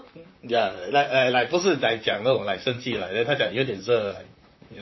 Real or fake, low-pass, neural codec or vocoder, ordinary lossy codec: fake; 7.2 kHz; vocoder, 44.1 kHz, 128 mel bands, Pupu-Vocoder; MP3, 24 kbps